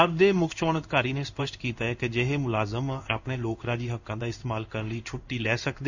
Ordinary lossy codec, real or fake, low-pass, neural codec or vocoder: none; fake; 7.2 kHz; codec, 16 kHz in and 24 kHz out, 1 kbps, XY-Tokenizer